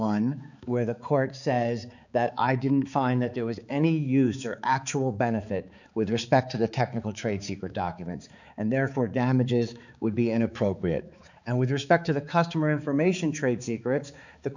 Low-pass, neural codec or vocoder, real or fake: 7.2 kHz; codec, 16 kHz, 4 kbps, X-Codec, HuBERT features, trained on balanced general audio; fake